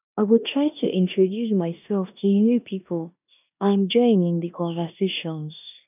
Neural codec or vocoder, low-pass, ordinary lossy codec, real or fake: codec, 16 kHz in and 24 kHz out, 0.9 kbps, LongCat-Audio-Codec, four codebook decoder; 3.6 kHz; none; fake